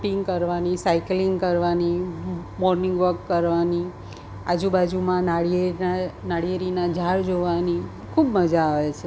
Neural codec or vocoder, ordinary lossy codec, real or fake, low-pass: none; none; real; none